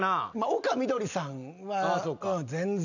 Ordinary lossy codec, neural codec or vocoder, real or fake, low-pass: none; none; real; 7.2 kHz